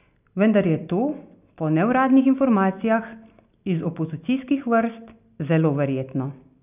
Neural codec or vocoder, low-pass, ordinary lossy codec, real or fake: none; 3.6 kHz; none; real